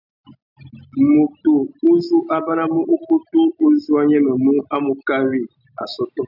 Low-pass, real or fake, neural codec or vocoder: 5.4 kHz; real; none